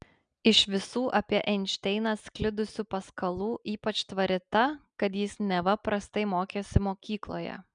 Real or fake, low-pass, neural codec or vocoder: real; 9.9 kHz; none